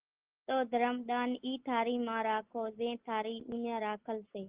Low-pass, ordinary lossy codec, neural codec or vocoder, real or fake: 3.6 kHz; Opus, 16 kbps; none; real